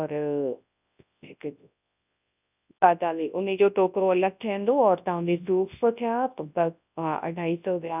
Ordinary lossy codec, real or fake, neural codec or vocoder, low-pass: none; fake; codec, 24 kHz, 0.9 kbps, WavTokenizer, large speech release; 3.6 kHz